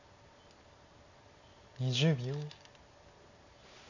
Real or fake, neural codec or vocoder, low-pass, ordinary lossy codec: real; none; 7.2 kHz; none